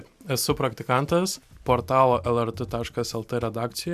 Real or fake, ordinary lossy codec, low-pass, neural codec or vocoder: real; AAC, 96 kbps; 14.4 kHz; none